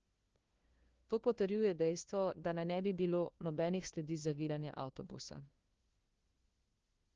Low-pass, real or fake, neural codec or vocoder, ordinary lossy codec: 7.2 kHz; fake; codec, 16 kHz, 1 kbps, FunCodec, trained on LibriTTS, 50 frames a second; Opus, 16 kbps